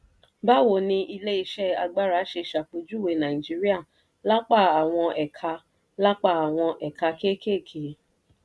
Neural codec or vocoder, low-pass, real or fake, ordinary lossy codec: none; none; real; none